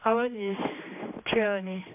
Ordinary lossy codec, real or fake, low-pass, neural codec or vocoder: MP3, 32 kbps; fake; 3.6 kHz; codec, 16 kHz, 2 kbps, X-Codec, HuBERT features, trained on general audio